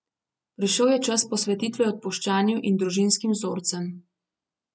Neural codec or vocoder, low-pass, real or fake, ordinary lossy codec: none; none; real; none